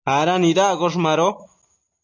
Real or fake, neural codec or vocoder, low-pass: real; none; 7.2 kHz